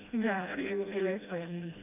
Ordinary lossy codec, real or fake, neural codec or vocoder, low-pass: none; fake; codec, 16 kHz, 1 kbps, FreqCodec, smaller model; 3.6 kHz